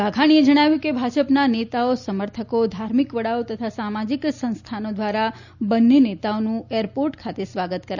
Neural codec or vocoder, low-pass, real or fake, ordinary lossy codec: none; 7.2 kHz; real; none